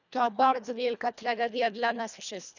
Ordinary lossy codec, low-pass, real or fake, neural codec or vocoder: none; 7.2 kHz; fake; codec, 24 kHz, 1.5 kbps, HILCodec